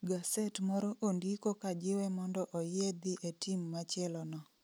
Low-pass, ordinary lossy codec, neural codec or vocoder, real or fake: none; none; none; real